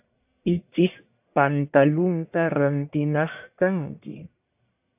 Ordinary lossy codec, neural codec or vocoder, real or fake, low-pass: AAC, 32 kbps; codec, 44.1 kHz, 1.7 kbps, Pupu-Codec; fake; 3.6 kHz